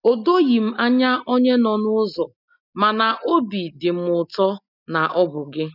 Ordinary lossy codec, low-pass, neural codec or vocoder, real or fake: none; 5.4 kHz; none; real